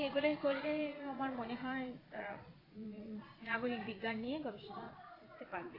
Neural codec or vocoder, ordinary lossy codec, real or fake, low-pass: vocoder, 22.05 kHz, 80 mel bands, Vocos; AAC, 24 kbps; fake; 5.4 kHz